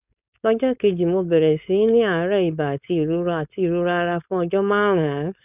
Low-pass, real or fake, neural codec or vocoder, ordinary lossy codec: 3.6 kHz; fake; codec, 16 kHz, 4.8 kbps, FACodec; none